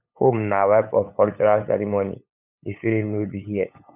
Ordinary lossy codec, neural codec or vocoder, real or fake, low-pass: none; codec, 16 kHz, 8 kbps, FunCodec, trained on LibriTTS, 25 frames a second; fake; 3.6 kHz